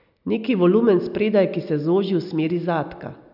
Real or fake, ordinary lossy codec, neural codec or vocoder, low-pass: real; none; none; 5.4 kHz